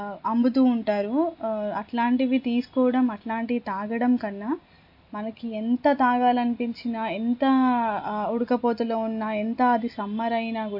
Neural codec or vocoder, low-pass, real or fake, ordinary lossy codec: none; 5.4 kHz; real; MP3, 32 kbps